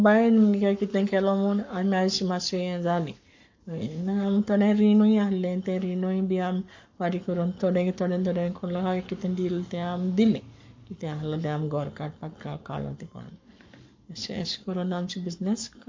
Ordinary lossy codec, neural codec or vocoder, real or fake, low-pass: MP3, 48 kbps; codec, 44.1 kHz, 7.8 kbps, Pupu-Codec; fake; 7.2 kHz